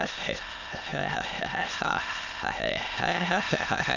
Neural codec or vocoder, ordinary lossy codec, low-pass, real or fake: autoencoder, 22.05 kHz, a latent of 192 numbers a frame, VITS, trained on many speakers; none; 7.2 kHz; fake